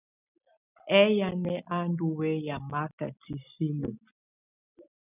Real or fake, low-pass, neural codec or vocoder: real; 3.6 kHz; none